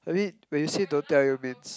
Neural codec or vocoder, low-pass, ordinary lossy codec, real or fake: none; none; none; real